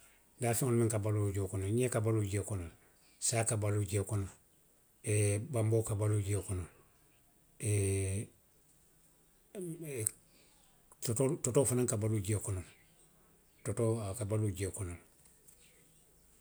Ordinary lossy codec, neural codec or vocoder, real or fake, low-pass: none; none; real; none